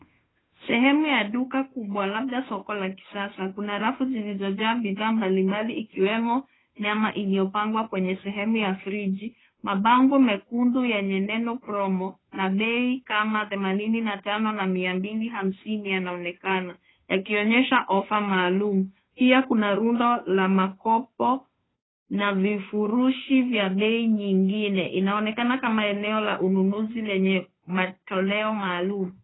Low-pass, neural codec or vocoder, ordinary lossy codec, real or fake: 7.2 kHz; codec, 16 kHz, 2 kbps, FunCodec, trained on Chinese and English, 25 frames a second; AAC, 16 kbps; fake